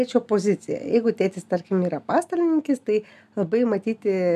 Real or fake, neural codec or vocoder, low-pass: real; none; 14.4 kHz